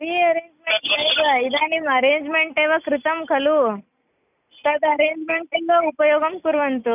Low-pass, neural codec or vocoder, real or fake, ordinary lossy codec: 3.6 kHz; none; real; none